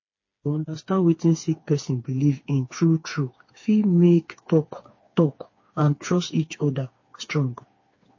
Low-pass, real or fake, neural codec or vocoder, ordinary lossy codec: 7.2 kHz; fake; codec, 16 kHz, 4 kbps, FreqCodec, smaller model; MP3, 32 kbps